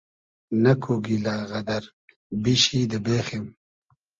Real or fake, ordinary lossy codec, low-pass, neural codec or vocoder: real; Opus, 24 kbps; 7.2 kHz; none